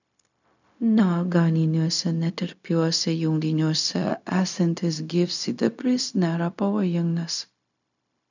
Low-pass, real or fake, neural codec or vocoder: 7.2 kHz; fake; codec, 16 kHz, 0.4 kbps, LongCat-Audio-Codec